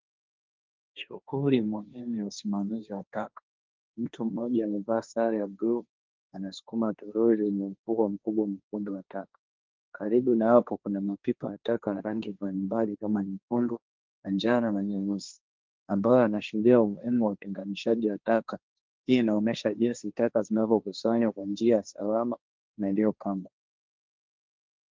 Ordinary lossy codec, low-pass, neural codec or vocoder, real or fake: Opus, 32 kbps; 7.2 kHz; codec, 16 kHz, 1.1 kbps, Voila-Tokenizer; fake